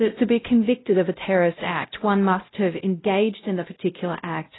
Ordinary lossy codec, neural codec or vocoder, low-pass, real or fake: AAC, 16 kbps; codec, 16 kHz, 0.5 kbps, X-Codec, WavLM features, trained on Multilingual LibriSpeech; 7.2 kHz; fake